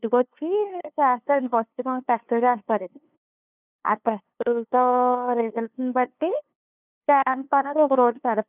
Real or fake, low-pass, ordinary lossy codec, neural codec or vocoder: fake; 3.6 kHz; none; codec, 16 kHz, 2 kbps, FunCodec, trained on LibriTTS, 25 frames a second